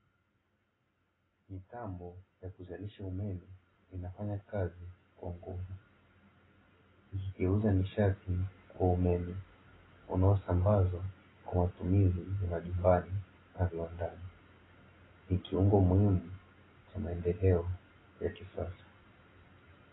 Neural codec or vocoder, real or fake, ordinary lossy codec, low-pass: none; real; AAC, 16 kbps; 7.2 kHz